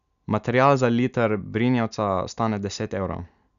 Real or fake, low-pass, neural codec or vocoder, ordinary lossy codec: real; 7.2 kHz; none; none